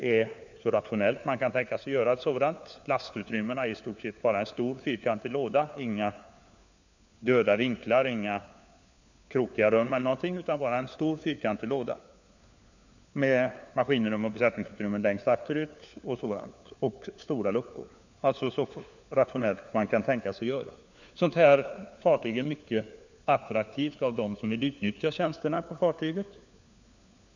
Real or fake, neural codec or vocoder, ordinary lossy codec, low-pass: fake; codec, 16 kHz, 4 kbps, FunCodec, trained on LibriTTS, 50 frames a second; none; 7.2 kHz